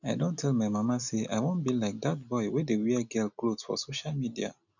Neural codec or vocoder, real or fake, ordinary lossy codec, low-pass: none; real; none; 7.2 kHz